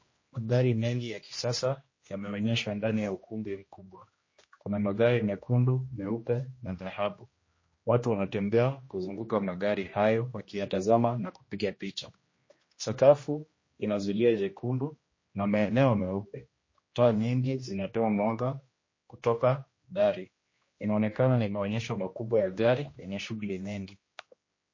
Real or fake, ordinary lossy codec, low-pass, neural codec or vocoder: fake; MP3, 32 kbps; 7.2 kHz; codec, 16 kHz, 1 kbps, X-Codec, HuBERT features, trained on general audio